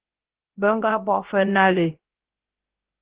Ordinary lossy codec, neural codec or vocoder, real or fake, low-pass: Opus, 16 kbps; codec, 16 kHz, 0.3 kbps, FocalCodec; fake; 3.6 kHz